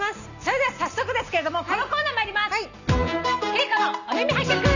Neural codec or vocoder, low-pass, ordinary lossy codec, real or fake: none; 7.2 kHz; none; real